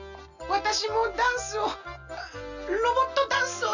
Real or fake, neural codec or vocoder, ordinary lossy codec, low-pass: real; none; none; 7.2 kHz